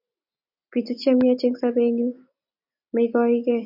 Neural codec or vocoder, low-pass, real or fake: none; 5.4 kHz; real